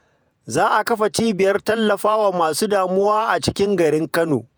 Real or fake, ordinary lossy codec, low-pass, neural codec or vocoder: fake; none; none; vocoder, 48 kHz, 128 mel bands, Vocos